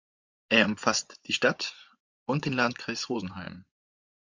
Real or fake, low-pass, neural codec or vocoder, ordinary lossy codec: fake; 7.2 kHz; vocoder, 24 kHz, 100 mel bands, Vocos; MP3, 64 kbps